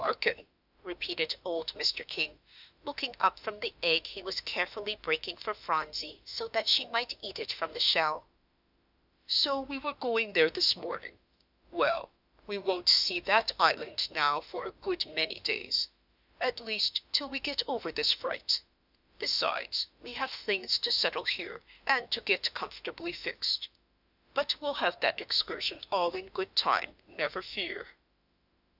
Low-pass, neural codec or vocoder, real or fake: 5.4 kHz; autoencoder, 48 kHz, 32 numbers a frame, DAC-VAE, trained on Japanese speech; fake